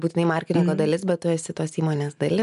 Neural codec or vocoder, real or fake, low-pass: none; real; 10.8 kHz